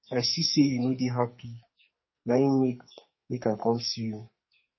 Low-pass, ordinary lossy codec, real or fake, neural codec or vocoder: 7.2 kHz; MP3, 24 kbps; fake; codec, 44.1 kHz, 2.6 kbps, SNAC